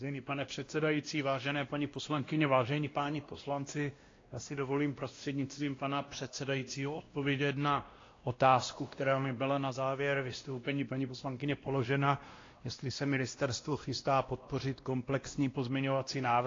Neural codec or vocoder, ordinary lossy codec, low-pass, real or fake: codec, 16 kHz, 1 kbps, X-Codec, WavLM features, trained on Multilingual LibriSpeech; AAC, 32 kbps; 7.2 kHz; fake